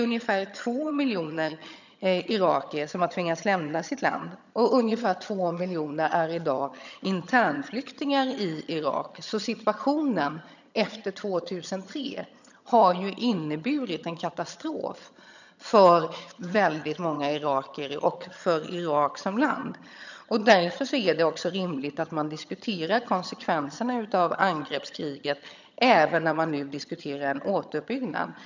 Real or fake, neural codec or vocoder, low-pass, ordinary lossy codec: fake; vocoder, 22.05 kHz, 80 mel bands, HiFi-GAN; 7.2 kHz; none